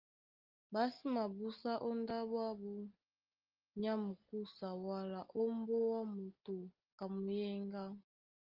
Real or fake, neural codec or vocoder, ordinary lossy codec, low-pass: real; none; Opus, 32 kbps; 5.4 kHz